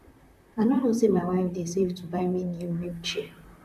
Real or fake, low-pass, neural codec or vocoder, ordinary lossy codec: fake; 14.4 kHz; vocoder, 44.1 kHz, 128 mel bands, Pupu-Vocoder; none